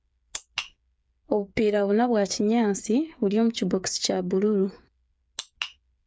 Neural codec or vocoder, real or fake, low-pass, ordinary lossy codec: codec, 16 kHz, 8 kbps, FreqCodec, smaller model; fake; none; none